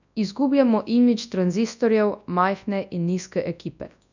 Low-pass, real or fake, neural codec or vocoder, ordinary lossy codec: 7.2 kHz; fake; codec, 24 kHz, 0.9 kbps, WavTokenizer, large speech release; none